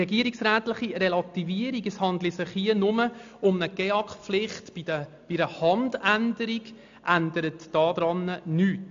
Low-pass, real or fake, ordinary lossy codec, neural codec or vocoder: 7.2 kHz; real; AAC, 64 kbps; none